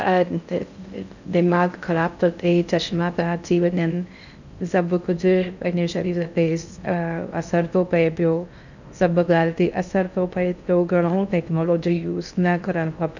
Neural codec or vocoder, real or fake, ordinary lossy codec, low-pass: codec, 16 kHz in and 24 kHz out, 0.6 kbps, FocalCodec, streaming, 4096 codes; fake; none; 7.2 kHz